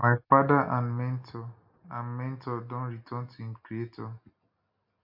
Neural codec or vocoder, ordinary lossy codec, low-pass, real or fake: none; MP3, 48 kbps; 5.4 kHz; real